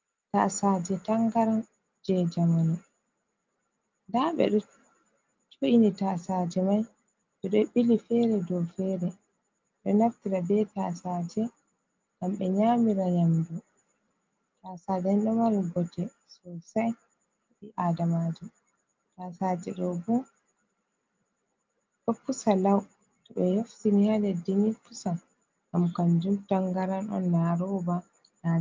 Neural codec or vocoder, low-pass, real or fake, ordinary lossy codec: none; 7.2 kHz; real; Opus, 32 kbps